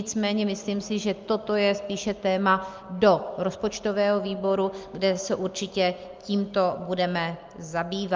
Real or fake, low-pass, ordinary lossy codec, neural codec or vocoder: real; 7.2 kHz; Opus, 24 kbps; none